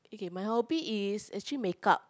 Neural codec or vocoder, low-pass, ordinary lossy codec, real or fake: none; none; none; real